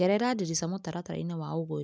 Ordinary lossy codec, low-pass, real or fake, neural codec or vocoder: none; none; real; none